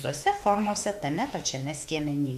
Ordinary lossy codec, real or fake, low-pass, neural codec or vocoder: MP3, 64 kbps; fake; 14.4 kHz; autoencoder, 48 kHz, 32 numbers a frame, DAC-VAE, trained on Japanese speech